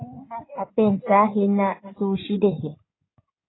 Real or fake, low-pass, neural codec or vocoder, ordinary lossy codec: fake; 7.2 kHz; codec, 16 kHz in and 24 kHz out, 2.2 kbps, FireRedTTS-2 codec; AAC, 16 kbps